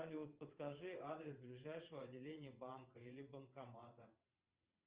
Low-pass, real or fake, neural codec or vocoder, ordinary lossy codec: 3.6 kHz; fake; vocoder, 22.05 kHz, 80 mel bands, WaveNeXt; Opus, 32 kbps